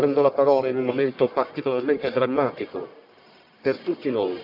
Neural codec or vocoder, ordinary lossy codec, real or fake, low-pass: codec, 44.1 kHz, 1.7 kbps, Pupu-Codec; none; fake; 5.4 kHz